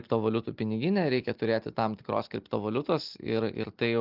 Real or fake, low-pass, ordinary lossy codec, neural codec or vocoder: real; 5.4 kHz; Opus, 32 kbps; none